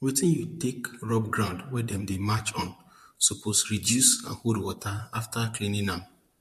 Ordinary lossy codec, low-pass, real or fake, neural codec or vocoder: MP3, 64 kbps; 14.4 kHz; fake; vocoder, 44.1 kHz, 128 mel bands, Pupu-Vocoder